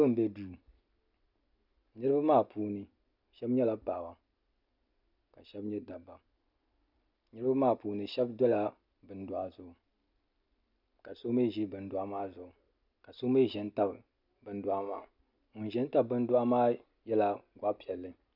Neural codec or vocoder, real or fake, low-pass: none; real; 5.4 kHz